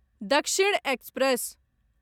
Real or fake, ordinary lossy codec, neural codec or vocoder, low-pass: real; none; none; 19.8 kHz